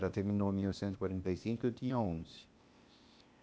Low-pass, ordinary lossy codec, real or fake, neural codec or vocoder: none; none; fake; codec, 16 kHz, 0.8 kbps, ZipCodec